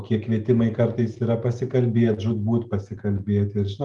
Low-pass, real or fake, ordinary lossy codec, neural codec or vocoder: 10.8 kHz; real; Opus, 32 kbps; none